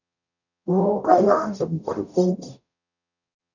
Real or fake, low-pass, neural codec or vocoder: fake; 7.2 kHz; codec, 44.1 kHz, 0.9 kbps, DAC